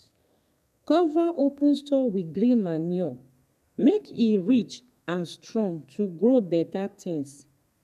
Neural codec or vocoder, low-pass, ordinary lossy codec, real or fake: codec, 32 kHz, 1.9 kbps, SNAC; 14.4 kHz; none; fake